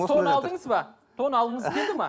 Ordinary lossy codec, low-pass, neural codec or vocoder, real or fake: none; none; none; real